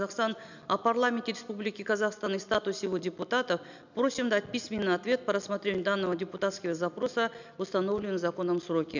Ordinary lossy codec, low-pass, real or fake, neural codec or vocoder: none; 7.2 kHz; real; none